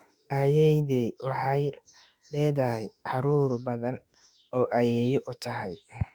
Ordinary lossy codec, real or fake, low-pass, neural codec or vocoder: Opus, 64 kbps; fake; 19.8 kHz; autoencoder, 48 kHz, 32 numbers a frame, DAC-VAE, trained on Japanese speech